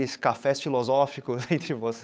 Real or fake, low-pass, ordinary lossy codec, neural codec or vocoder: fake; none; none; codec, 16 kHz, 8 kbps, FunCodec, trained on Chinese and English, 25 frames a second